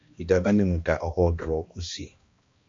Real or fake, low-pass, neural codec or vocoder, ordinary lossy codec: fake; 7.2 kHz; codec, 16 kHz, 1 kbps, X-Codec, HuBERT features, trained on balanced general audio; AAC, 48 kbps